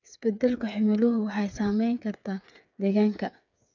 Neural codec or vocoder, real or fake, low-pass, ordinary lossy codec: codec, 16 kHz, 8 kbps, FreqCodec, smaller model; fake; 7.2 kHz; none